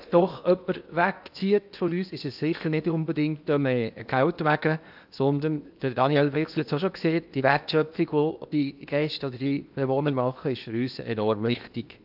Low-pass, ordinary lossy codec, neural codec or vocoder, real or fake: 5.4 kHz; AAC, 48 kbps; codec, 16 kHz in and 24 kHz out, 0.8 kbps, FocalCodec, streaming, 65536 codes; fake